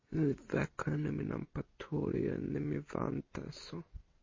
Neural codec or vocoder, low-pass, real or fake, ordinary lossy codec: none; 7.2 kHz; real; MP3, 32 kbps